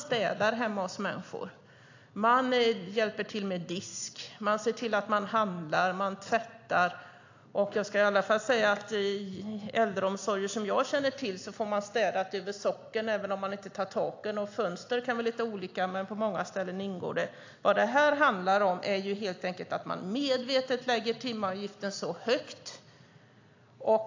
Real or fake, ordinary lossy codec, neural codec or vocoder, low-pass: real; AAC, 48 kbps; none; 7.2 kHz